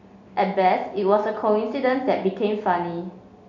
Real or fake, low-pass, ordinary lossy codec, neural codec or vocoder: real; 7.2 kHz; none; none